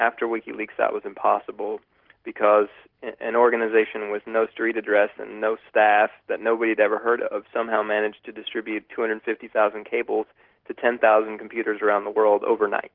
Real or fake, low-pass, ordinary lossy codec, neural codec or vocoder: real; 5.4 kHz; Opus, 16 kbps; none